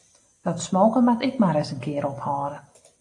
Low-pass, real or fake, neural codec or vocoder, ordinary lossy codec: 10.8 kHz; real; none; AAC, 48 kbps